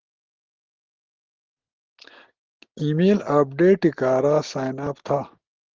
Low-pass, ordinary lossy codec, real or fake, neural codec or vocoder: 7.2 kHz; Opus, 16 kbps; real; none